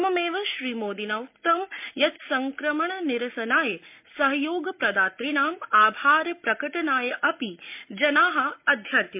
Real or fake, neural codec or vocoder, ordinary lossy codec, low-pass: real; none; MP3, 32 kbps; 3.6 kHz